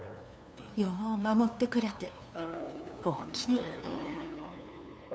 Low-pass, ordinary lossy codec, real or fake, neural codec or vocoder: none; none; fake; codec, 16 kHz, 2 kbps, FunCodec, trained on LibriTTS, 25 frames a second